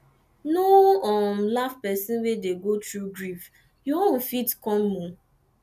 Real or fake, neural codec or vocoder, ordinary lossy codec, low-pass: real; none; none; 14.4 kHz